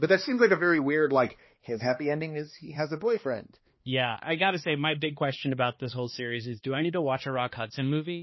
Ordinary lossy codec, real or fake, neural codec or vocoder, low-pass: MP3, 24 kbps; fake; codec, 16 kHz, 2 kbps, X-Codec, HuBERT features, trained on balanced general audio; 7.2 kHz